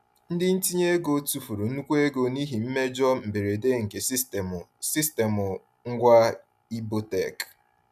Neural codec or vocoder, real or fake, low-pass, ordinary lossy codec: none; real; 14.4 kHz; none